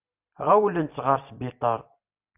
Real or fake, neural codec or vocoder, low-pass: fake; vocoder, 44.1 kHz, 128 mel bands every 256 samples, BigVGAN v2; 3.6 kHz